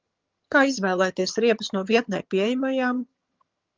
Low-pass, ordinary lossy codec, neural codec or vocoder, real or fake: 7.2 kHz; Opus, 32 kbps; vocoder, 22.05 kHz, 80 mel bands, HiFi-GAN; fake